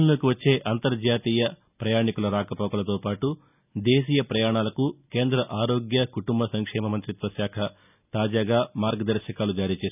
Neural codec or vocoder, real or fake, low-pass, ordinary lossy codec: none; real; 3.6 kHz; none